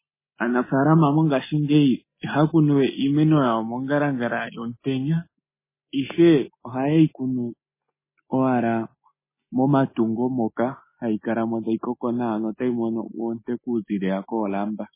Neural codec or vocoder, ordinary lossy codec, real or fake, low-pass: none; MP3, 16 kbps; real; 3.6 kHz